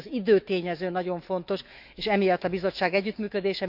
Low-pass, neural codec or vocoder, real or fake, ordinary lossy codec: 5.4 kHz; autoencoder, 48 kHz, 128 numbers a frame, DAC-VAE, trained on Japanese speech; fake; none